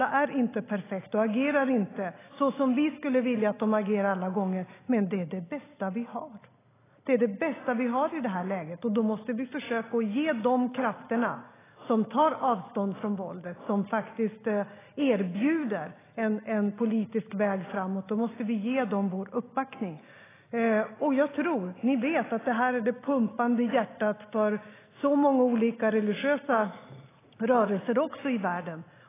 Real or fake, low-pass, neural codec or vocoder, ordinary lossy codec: real; 3.6 kHz; none; AAC, 16 kbps